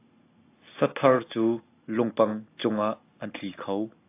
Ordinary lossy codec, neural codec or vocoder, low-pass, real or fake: AAC, 24 kbps; none; 3.6 kHz; real